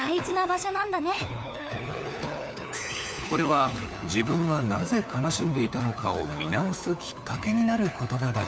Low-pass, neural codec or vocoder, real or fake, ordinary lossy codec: none; codec, 16 kHz, 4 kbps, FunCodec, trained on LibriTTS, 50 frames a second; fake; none